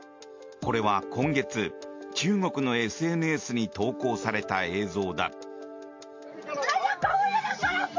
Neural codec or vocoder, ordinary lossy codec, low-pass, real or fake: none; MP3, 48 kbps; 7.2 kHz; real